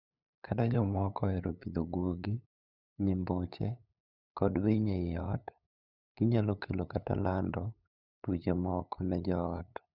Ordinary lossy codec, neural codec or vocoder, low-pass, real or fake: none; codec, 16 kHz, 8 kbps, FunCodec, trained on LibriTTS, 25 frames a second; 5.4 kHz; fake